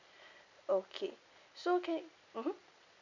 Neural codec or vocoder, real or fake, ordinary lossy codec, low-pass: none; real; none; 7.2 kHz